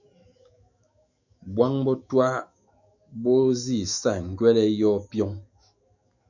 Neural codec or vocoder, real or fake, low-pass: autoencoder, 48 kHz, 128 numbers a frame, DAC-VAE, trained on Japanese speech; fake; 7.2 kHz